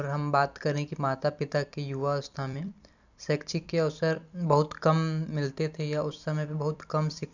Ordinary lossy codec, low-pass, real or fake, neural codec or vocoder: none; 7.2 kHz; fake; vocoder, 44.1 kHz, 128 mel bands every 256 samples, BigVGAN v2